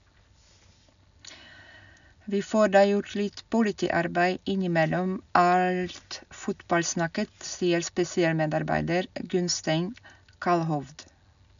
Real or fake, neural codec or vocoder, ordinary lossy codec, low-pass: real; none; none; 7.2 kHz